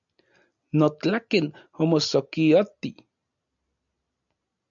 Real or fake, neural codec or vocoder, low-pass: real; none; 7.2 kHz